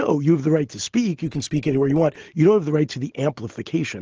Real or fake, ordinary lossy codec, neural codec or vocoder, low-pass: real; Opus, 16 kbps; none; 7.2 kHz